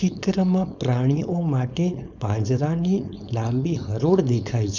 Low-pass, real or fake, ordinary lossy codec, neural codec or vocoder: 7.2 kHz; fake; none; codec, 16 kHz, 4.8 kbps, FACodec